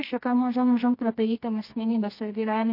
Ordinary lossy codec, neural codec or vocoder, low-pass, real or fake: MP3, 32 kbps; codec, 24 kHz, 0.9 kbps, WavTokenizer, medium music audio release; 5.4 kHz; fake